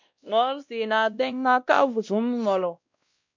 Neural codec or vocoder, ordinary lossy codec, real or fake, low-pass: codec, 16 kHz, 1 kbps, X-Codec, WavLM features, trained on Multilingual LibriSpeech; MP3, 64 kbps; fake; 7.2 kHz